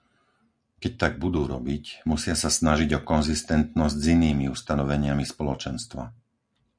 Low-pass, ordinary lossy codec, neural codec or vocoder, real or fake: 9.9 kHz; MP3, 96 kbps; none; real